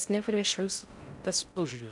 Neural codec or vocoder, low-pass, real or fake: codec, 16 kHz in and 24 kHz out, 0.6 kbps, FocalCodec, streaming, 4096 codes; 10.8 kHz; fake